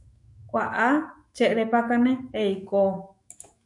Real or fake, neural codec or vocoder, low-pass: fake; autoencoder, 48 kHz, 128 numbers a frame, DAC-VAE, trained on Japanese speech; 10.8 kHz